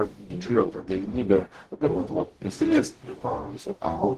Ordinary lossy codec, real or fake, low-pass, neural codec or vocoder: Opus, 16 kbps; fake; 14.4 kHz; codec, 44.1 kHz, 0.9 kbps, DAC